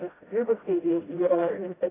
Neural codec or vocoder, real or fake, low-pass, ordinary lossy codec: codec, 16 kHz, 0.5 kbps, FreqCodec, smaller model; fake; 3.6 kHz; AAC, 16 kbps